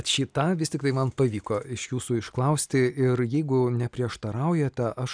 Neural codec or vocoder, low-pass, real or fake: none; 9.9 kHz; real